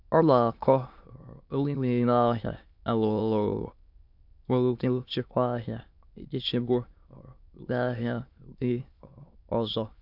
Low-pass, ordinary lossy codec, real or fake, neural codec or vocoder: 5.4 kHz; MP3, 48 kbps; fake; autoencoder, 22.05 kHz, a latent of 192 numbers a frame, VITS, trained on many speakers